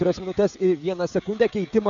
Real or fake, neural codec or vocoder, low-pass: fake; codec, 16 kHz, 16 kbps, FreqCodec, smaller model; 7.2 kHz